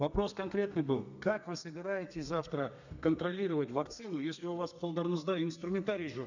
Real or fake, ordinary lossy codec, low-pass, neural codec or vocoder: fake; none; 7.2 kHz; codec, 32 kHz, 1.9 kbps, SNAC